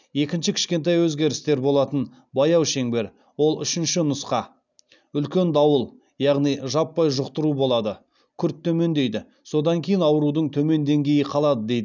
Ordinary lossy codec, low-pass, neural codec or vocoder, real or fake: none; 7.2 kHz; none; real